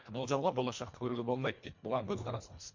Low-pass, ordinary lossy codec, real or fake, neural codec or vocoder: 7.2 kHz; MP3, 48 kbps; fake; codec, 24 kHz, 1.5 kbps, HILCodec